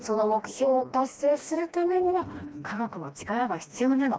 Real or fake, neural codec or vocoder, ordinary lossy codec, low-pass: fake; codec, 16 kHz, 1 kbps, FreqCodec, smaller model; none; none